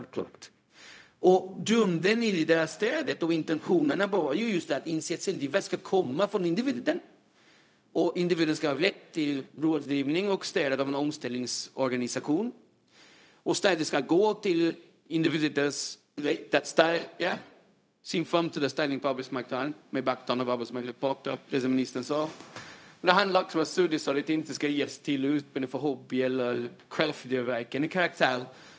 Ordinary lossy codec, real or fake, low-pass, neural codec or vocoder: none; fake; none; codec, 16 kHz, 0.4 kbps, LongCat-Audio-Codec